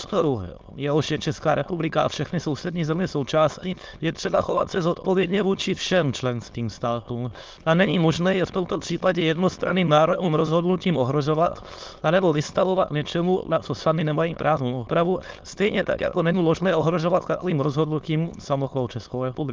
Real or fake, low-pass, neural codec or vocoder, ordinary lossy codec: fake; 7.2 kHz; autoencoder, 22.05 kHz, a latent of 192 numbers a frame, VITS, trained on many speakers; Opus, 32 kbps